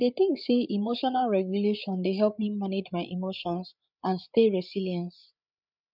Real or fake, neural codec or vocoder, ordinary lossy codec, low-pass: fake; codec, 16 kHz, 8 kbps, FreqCodec, larger model; none; 5.4 kHz